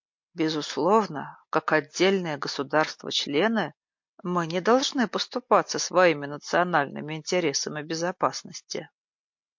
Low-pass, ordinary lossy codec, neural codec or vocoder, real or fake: 7.2 kHz; MP3, 48 kbps; none; real